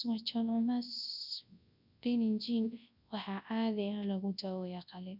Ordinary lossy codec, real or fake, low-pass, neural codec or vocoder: none; fake; 5.4 kHz; codec, 24 kHz, 0.9 kbps, WavTokenizer, large speech release